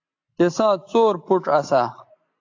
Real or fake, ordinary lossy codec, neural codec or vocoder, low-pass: real; AAC, 48 kbps; none; 7.2 kHz